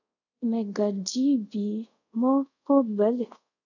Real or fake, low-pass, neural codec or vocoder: fake; 7.2 kHz; codec, 24 kHz, 0.5 kbps, DualCodec